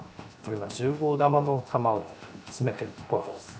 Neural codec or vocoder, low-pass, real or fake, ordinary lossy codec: codec, 16 kHz, 0.7 kbps, FocalCodec; none; fake; none